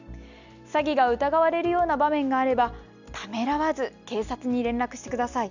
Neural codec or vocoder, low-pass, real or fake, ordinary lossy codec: none; 7.2 kHz; real; Opus, 64 kbps